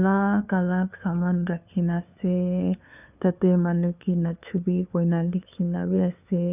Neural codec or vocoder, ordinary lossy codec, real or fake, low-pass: codec, 16 kHz, 2 kbps, FunCodec, trained on LibriTTS, 25 frames a second; none; fake; 3.6 kHz